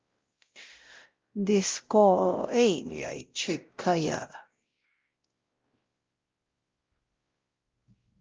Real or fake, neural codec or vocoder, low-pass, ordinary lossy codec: fake; codec, 16 kHz, 0.5 kbps, X-Codec, WavLM features, trained on Multilingual LibriSpeech; 7.2 kHz; Opus, 24 kbps